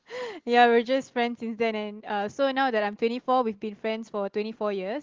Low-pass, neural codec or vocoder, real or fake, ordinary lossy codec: 7.2 kHz; none; real; Opus, 16 kbps